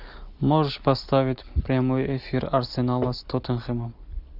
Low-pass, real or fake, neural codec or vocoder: 5.4 kHz; real; none